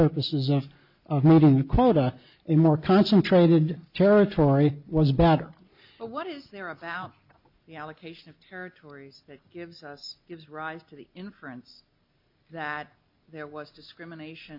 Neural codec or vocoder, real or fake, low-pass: none; real; 5.4 kHz